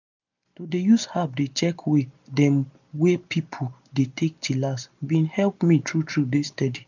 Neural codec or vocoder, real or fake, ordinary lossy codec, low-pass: none; real; none; 7.2 kHz